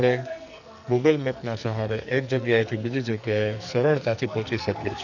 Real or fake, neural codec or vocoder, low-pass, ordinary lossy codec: fake; codec, 44.1 kHz, 2.6 kbps, SNAC; 7.2 kHz; none